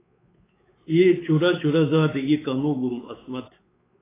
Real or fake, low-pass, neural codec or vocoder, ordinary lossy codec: fake; 3.6 kHz; codec, 16 kHz, 4 kbps, X-Codec, WavLM features, trained on Multilingual LibriSpeech; AAC, 16 kbps